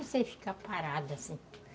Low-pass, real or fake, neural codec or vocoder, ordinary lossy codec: none; real; none; none